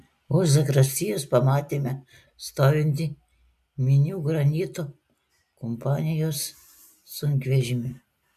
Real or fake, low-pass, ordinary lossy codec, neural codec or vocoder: real; 14.4 kHz; MP3, 96 kbps; none